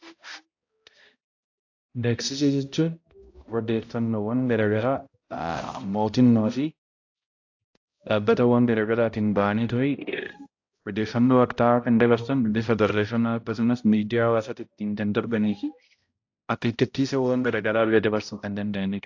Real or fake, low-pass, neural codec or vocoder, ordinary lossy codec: fake; 7.2 kHz; codec, 16 kHz, 0.5 kbps, X-Codec, HuBERT features, trained on balanced general audio; AAC, 48 kbps